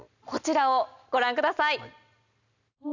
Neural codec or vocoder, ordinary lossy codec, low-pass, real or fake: none; none; 7.2 kHz; real